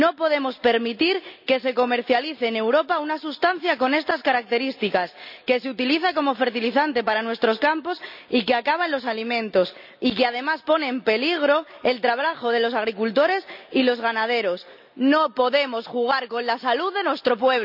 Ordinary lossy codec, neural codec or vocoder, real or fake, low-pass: none; none; real; 5.4 kHz